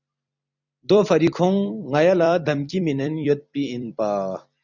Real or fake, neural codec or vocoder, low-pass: fake; vocoder, 44.1 kHz, 128 mel bands every 256 samples, BigVGAN v2; 7.2 kHz